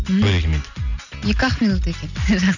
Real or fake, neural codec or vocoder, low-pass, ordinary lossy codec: real; none; 7.2 kHz; none